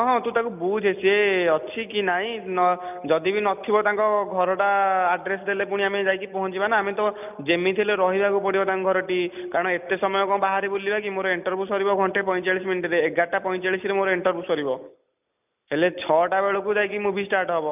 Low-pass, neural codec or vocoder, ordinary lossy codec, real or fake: 3.6 kHz; none; none; real